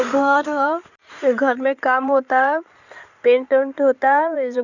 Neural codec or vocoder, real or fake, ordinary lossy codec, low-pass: codec, 16 kHz in and 24 kHz out, 2.2 kbps, FireRedTTS-2 codec; fake; none; 7.2 kHz